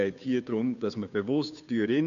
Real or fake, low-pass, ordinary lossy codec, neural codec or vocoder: fake; 7.2 kHz; none; codec, 16 kHz, 2 kbps, FunCodec, trained on Chinese and English, 25 frames a second